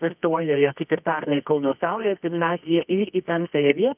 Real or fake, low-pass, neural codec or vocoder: fake; 3.6 kHz; codec, 24 kHz, 0.9 kbps, WavTokenizer, medium music audio release